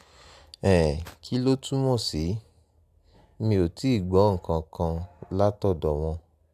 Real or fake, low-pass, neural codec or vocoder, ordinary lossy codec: real; 14.4 kHz; none; AAC, 96 kbps